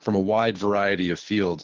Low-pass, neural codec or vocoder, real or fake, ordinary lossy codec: 7.2 kHz; codec, 24 kHz, 6 kbps, HILCodec; fake; Opus, 16 kbps